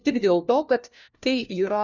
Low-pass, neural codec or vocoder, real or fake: 7.2 kHz; codec, 16 kHz, 1 kbps, FunCodec, trained on LibriTTS, 50 frames a second; fake